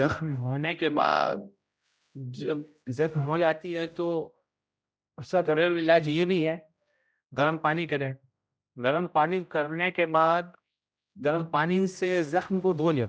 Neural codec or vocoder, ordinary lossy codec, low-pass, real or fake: codec, 16 kHz, 0.5 kbps, X-Codec, HuBERT features, trained on general audio; none; none; fake